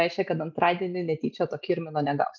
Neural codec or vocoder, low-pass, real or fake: none; 7.2 kHz; real